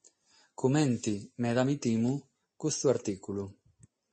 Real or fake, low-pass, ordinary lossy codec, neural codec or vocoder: real; 10.8 kHz; MP3, 32 kbps; none